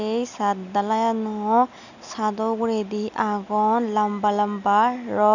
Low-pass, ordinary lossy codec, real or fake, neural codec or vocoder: 7.2 kHz; none; real; none